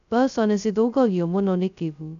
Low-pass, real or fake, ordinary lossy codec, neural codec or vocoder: 7.2 kHz; fake; none; codec, 16 kHz, 0.2 kbps, FocalCodec